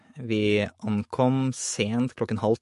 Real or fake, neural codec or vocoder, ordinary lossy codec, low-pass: fake; autoencoder, 48 kHz, 128 numbers a frame, DAC-VAE, trained on Japanese speech; MP3, 48 kbps; 14.4 kHz